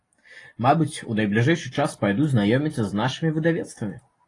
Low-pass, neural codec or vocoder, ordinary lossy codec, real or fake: 10.8 kHz; none; AAC, 32 kbps; real